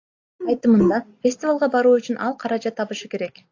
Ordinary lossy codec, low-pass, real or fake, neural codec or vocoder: AAC, 48 kbps; 7.2 kHz; real; none